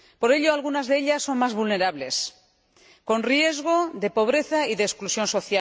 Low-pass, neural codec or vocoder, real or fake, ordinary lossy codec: none; none; real; none